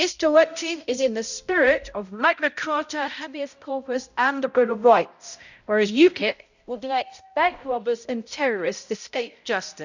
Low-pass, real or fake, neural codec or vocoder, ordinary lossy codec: 7.2 kHz; fake; codec, 16 kHz, 0.5 kbps, X-Codec, HuBERT features, trained on balanced general audio; none